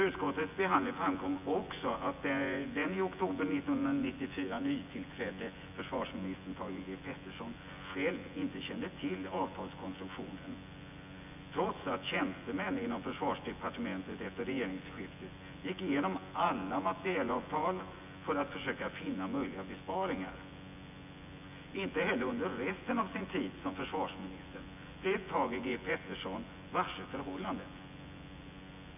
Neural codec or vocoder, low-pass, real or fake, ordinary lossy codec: vocoder, 24 kHz, 100 mel bands, Vocos; 3.6 kHz; fake; none